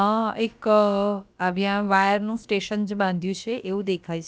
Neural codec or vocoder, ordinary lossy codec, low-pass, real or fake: codec, 16 kHz, about 1 kbps, DyCAST, with the encoder's durations; none; none; fake